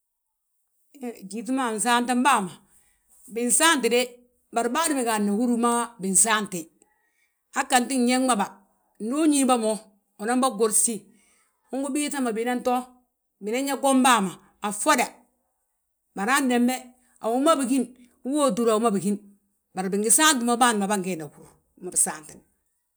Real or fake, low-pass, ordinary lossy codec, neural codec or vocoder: real; none; none; none